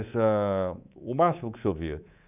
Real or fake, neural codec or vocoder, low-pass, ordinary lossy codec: fake; codec, 24 kHz, 3.1 kbps, DualCodec; 3.6 kHz; none